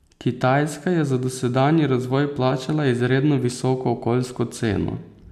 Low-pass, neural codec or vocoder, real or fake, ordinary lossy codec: 14.4 kHz; none; real; none